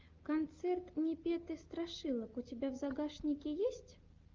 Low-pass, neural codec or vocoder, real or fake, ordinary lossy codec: 7.2 kHz; none; real; Opus, 32 kbps